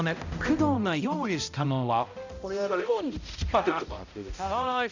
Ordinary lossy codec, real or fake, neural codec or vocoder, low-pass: none; fake; codec, 16 kHz, 0.5 kbps, X-Codec, HuBERT features, trained on balanced general audio; 7.2 kHz